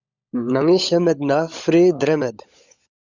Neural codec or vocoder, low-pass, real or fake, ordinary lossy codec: codec, 16 kHz, 16 kbps, FunCodec, trained on LibriTTS, 50 frames a second; 7.2 kHz; fake; Opus, 64 kbps